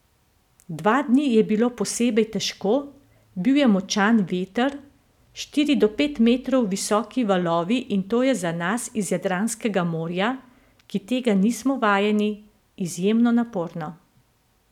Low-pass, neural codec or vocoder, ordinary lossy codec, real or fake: 19.8 kHz; none; none; real